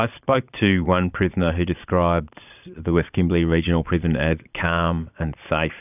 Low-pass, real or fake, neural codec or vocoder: 3.6 kHz; fake; vocoder, 22.05 kHz, 80 mel bands, Vocos